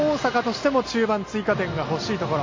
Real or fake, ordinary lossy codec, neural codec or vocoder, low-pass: real; MP3, 32 kbps; none; 7.2 kHz